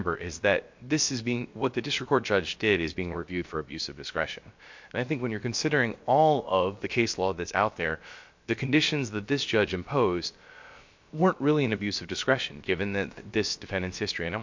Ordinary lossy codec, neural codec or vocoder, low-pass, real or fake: MP3, 48 kbps; codec, 16 kHz, about 1 kbps, DyCAST, with the encoder's durations; 7.2 kHz; fake